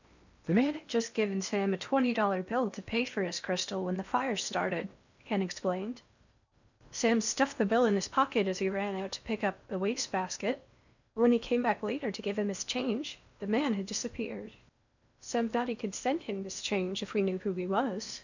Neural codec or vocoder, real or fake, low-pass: codec, 16 kHz in and 24 kHz out, 0.8 kbps, FocalCodec, streaming, 65536 codes; fake; 7.2 kHz